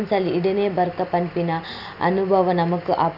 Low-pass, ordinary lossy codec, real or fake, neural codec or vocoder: 5.4 kHz; none; real; none